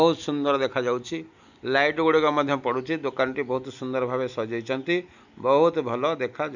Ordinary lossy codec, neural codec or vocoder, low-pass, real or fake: none; none; 7.2 kHz; real